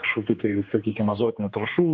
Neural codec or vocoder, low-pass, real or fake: codec, 16 kHz, 2 kbps, X-Codec, HuBERT features, trained on general audio; 7.2 kHz; fake